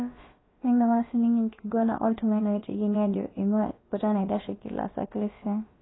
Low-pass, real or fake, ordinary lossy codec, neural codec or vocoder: 7.2 kHz; fake; AAC, 16 kbps; codec, 16 kHz, about 1 kbps, DyCAST, with the encoder's durations